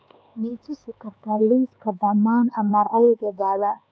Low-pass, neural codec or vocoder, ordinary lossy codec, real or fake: none; codec, 16 kHz, 4 kbps, X-Codec, HuBERT features, trained on LibriSpeech; none; fake